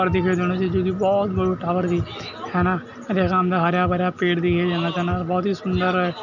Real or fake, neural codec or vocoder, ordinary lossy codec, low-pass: real; none; none; 7.2 kHz